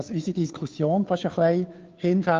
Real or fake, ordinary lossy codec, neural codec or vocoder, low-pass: fake; Opus, 24 kbps; codec, 16 kHz, 2 kbps, FunCodec, trained on Chinese and English, 25 frames a second; 7.2 kHz